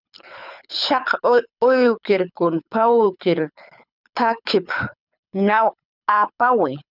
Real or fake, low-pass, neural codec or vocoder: fake; 5.4 kHz; codec, 24 kHz, 6 kbps, HILCodec